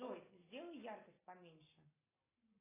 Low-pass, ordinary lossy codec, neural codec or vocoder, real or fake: 3.6 kHz; AAC, 16 kbps; none; real